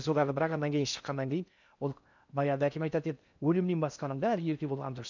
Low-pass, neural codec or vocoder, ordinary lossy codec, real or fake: 7.2 kHz; codec, 16 kHz in and 24 kHz out, 0.6 kbps, FocalCodec, streaming, 4096 codes; none; fake